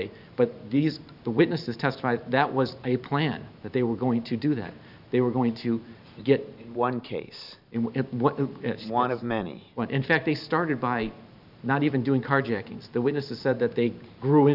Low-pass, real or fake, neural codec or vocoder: 5.4 kHz; fake; vocoder, 44.1 kHz, 128 mel bands every 512 samples, BigVGAN v2